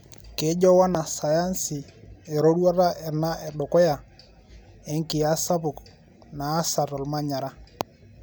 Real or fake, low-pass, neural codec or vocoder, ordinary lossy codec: real; none; none; none